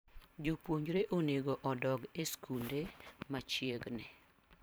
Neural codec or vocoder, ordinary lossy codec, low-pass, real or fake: vocoder, 44.1 kHz, 128 mel bands every 512 samples, BigVGAN v2; none; none; fake